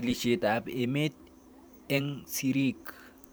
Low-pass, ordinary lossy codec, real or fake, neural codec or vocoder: none; none; fake; vocoder, 44.1 kHz, 128 mel bands every 512 samples, BigVGAN v2